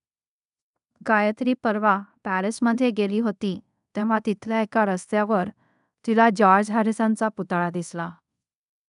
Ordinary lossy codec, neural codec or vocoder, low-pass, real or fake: none; codec, 24 kHz, 0.5 kbps, DualCodec; 10.8 kHz; fake